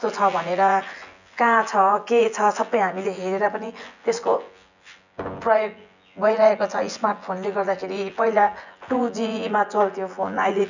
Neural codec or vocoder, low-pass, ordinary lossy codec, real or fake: vocoder, 24 kHz, 100 mel bands, Vocos; 7.2 kHz; none; fake